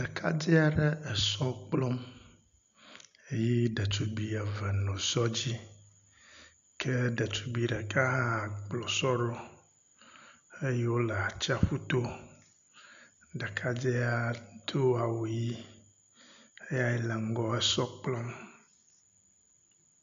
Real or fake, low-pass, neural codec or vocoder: real; 7.2 kHz; none